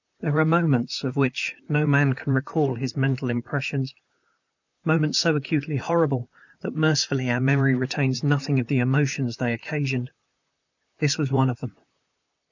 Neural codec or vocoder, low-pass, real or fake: vocoder, 44.1 kHz, 128 mel bands, Pupu-Vocoder; 7.2 kHz; fake